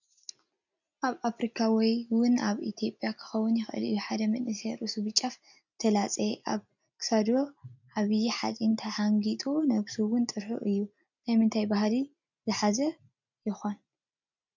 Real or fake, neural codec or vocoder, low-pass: real; none; 7.2 kHz